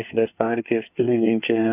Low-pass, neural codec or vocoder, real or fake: 3.6 kHz; codec, 24 kHz, 1 kbps, SNAC; fake